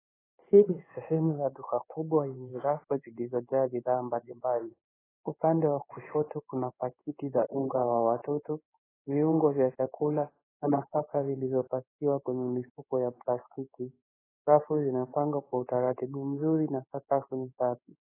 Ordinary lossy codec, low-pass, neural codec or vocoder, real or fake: AAC, 16 kbps; 3.6 kHz; codec, 16 kHz in and 24 kHz out, 1 kbps, XY-Tokenizer; fake